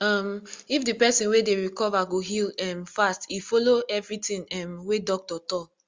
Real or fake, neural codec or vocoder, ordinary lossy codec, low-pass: real; none; Opus, 32 kbps; 7.2 kHz